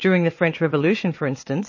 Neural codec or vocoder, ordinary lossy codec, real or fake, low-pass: vocoder, 22.05 kHz, 80 mel bands, Vocos; MP3, 32 kbps; fake; 7.2 kHz